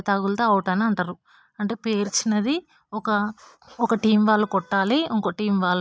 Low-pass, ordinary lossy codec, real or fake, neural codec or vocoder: none; none; real; none